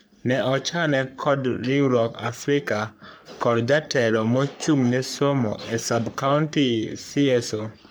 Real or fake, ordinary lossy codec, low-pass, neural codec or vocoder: fake; none; none; codec, 44.1 kHz, 3.4 kbps, Pupu-Codec